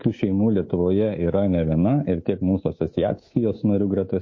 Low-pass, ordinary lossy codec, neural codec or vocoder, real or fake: 7.2 kHz; MP3, 32 kbps; codec, 24 kHz, 3.1 kbps, DualCodec; fake